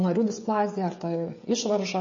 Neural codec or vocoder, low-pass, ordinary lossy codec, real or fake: codec, 16 kHz, 16 kbps, FreqCodec, smaller model; 7.2 kHz; MP3, 32 kbps; fake